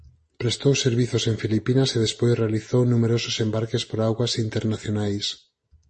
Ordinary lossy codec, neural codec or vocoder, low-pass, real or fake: MP3, 32 kbps; none; 10.8 kHz; real